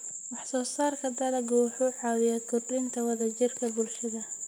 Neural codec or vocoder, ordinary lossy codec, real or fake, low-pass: vocoder, 44.1 kHz, 128 mel bands, Pupu-Vocoder; none; fake; none